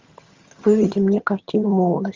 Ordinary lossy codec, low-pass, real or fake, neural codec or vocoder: Opus, 32 kbps; 7.2 kHz; fake; vocoder, 22.05 kHz, 80 mel bands, HiFi-GAN